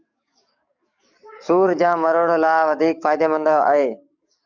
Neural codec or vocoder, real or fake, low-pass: codec, 44.1 kHz, 7.8 kbps, DAC; fake; 7.2 kHz